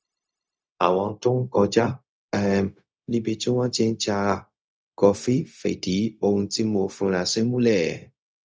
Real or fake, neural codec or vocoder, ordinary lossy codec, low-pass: fake; codec, 16 kHz, 0.4 kbps, LongCat-Audio-Codec; none; none